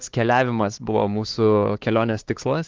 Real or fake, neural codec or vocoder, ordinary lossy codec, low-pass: fake; codec, 16 kHz, 4 kbps, X-Codec, HuBERT features, trained on LibriSpeech; Opus, 16 kbps; 7.2 kHz